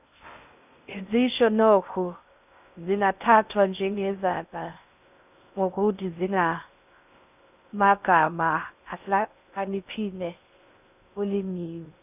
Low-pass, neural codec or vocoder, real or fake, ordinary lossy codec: 3.6 kHz; codec, 16 kHz in and 24 kHz out, 0.6 kbps, FocalCodec, streaming, 2048 codes; fake; none